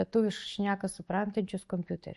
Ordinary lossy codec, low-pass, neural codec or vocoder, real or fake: MP3, 48 kbps; 14.4 kHz; none; real